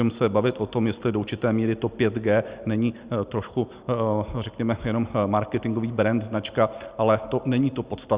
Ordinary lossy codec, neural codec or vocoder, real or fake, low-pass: Opus, 64 kbps; none; real; 3.6 kHz